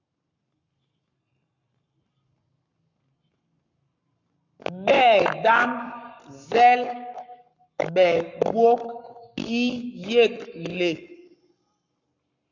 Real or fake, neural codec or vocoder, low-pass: fake; codec, 44.1 kHz, 7.8 kbps, Pupu-Codec; 7.2 kHz